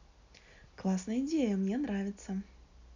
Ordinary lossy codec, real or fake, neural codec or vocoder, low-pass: none; real; none; 7.2 kHz